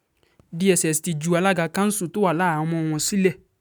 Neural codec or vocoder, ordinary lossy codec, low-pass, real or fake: none; none; none; real